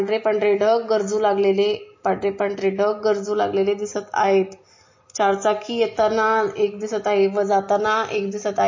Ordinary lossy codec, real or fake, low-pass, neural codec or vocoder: MP3, 32 kbps; real; 7.2 kHz; none